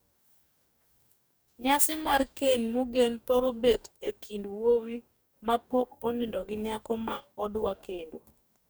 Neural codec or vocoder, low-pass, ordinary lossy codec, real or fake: codec, 44.1 kHz, 2.6 kbps, DAC; none; none; fake